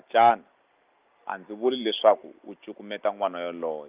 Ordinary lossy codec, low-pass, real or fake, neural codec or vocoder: Opus, 24 kbps; 3.6 kHz; real; none